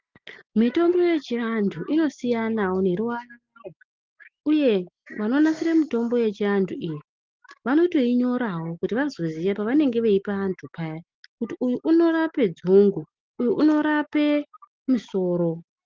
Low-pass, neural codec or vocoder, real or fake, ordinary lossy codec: 7.2 kHz; none; real; Opus, 32 kbps